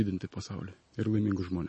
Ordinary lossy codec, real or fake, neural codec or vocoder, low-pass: MP3, 32 kbps; real; none; 10.8 kHz